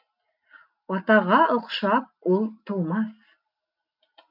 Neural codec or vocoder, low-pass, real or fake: none; 5.4 kHz; real